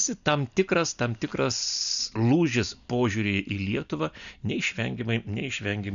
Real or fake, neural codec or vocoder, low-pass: real; none; 7.2 kHz